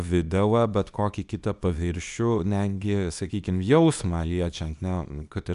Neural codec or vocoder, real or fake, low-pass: codec, 24 kHz, 0.9 kbps, WavTokenizer, small release; fake; 10.8 kHz